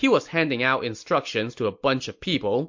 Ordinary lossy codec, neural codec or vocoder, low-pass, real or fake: MP3, 48 kbps; none; 7.2 kHz; real